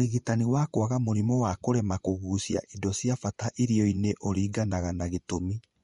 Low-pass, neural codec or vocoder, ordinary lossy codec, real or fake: 10.8 kHz; vocoder, 24 kHz, 100 mel bands, Vocos; MP3, 48 kbps; fake